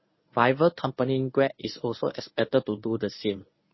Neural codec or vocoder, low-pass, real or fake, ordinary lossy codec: codec, 24 kHz, 0.9 kbps, WavTokenizer, medium speech release version 1; 7.2 kHz; fake; MP3, 24 kbps